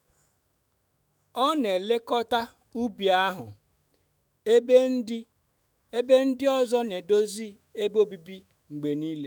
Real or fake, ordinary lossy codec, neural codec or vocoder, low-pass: fake; none; autoencoder, 48 kHz, 128 numbers a frame, DAC-VAE, trained on Japanese speech; none